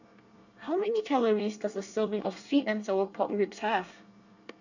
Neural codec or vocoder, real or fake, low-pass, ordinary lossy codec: codec, 24 kHz, 1 kbps, SNAC; fake; 7.2 kHz; none